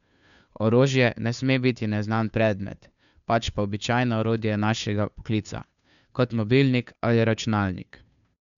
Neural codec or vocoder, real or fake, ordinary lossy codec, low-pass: codec, 16 kHz, 2 kbps, FunCodec, trained on Chinese and English, 25 frames a second; fake; none; 7.2 kHz